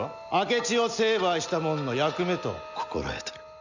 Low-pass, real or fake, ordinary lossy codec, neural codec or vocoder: 7.2 kHz; real; none; none